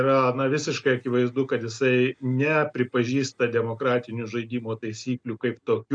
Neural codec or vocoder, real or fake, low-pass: none; real; 9.9 kHz